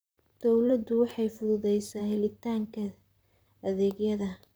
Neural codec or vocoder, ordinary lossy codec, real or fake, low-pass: vocoder, 44.1 kHz, 128 mel bands, Pupu-Vocoder; none; fake; none